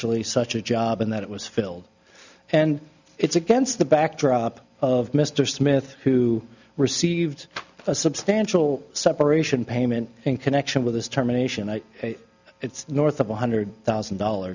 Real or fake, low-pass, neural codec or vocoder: real; 7.2 kHz; none